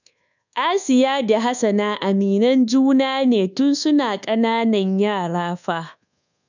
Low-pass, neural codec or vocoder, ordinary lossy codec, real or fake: 7.2 kHz; codec, 24 kHz, 1.2 kbps, DualCodec; none; fake